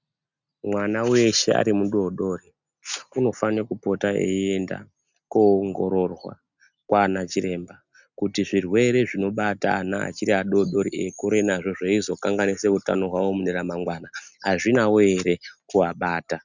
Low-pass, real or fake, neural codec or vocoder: 7.2 kHz; real; none